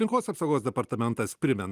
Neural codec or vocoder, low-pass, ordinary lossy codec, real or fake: none; 14.4 kHz; Opus, 32 kbps; real